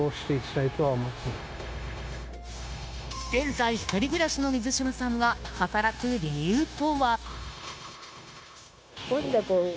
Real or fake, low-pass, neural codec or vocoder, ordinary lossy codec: fake; none; codec, 16 kHz, 0.9 kbps, LongCat-Audio-Codec; none